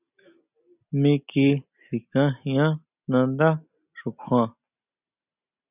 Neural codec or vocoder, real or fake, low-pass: none; real; 3.6 kHz